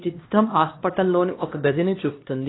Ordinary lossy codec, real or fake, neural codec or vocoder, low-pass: AAC, 16 kbps; fake; codec, 16 kHz, 1 kbps, X-Codec, HuBERT features, trained on LibriSpeech; 7.2 kHz